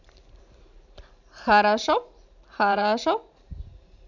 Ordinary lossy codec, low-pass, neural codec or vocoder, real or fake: none; 7.2 kHz; vocoder, 44.1 kHz, 128 mel bands every 512 samples, BigVGAN v2; fake